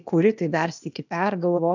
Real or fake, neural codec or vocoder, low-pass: fake; codec, 16 kHz, 0.8 kbps, ZipCodec; 7.2 kHz